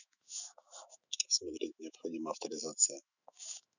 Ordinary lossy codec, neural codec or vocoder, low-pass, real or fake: none; autoencoder, 48 kHz, 128 numbers a frame, DAC-VAE, trained on Japanese speech; 7.2 kHz; fake